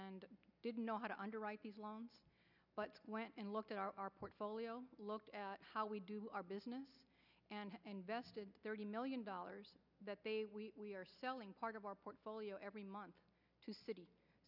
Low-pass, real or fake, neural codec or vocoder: 5.4 kHz; real; none